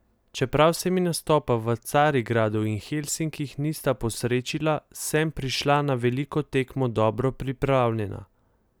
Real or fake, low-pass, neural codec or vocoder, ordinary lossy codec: real; none; none; none